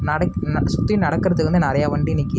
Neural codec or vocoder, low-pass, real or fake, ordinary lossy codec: none; none; real; none